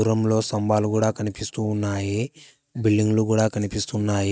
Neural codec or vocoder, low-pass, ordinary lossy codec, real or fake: none; none; none; real